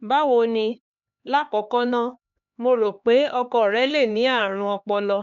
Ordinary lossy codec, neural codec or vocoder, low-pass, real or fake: none; codec, 16 kHz, 2 kbps, X-Codec, HuBERT features, trained on LibriSpeech; 7.2 kHz; fake